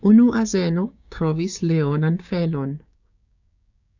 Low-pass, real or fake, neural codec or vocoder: 7.2 kHz; fake; codec, 16 kHz, 6 kbps, DAC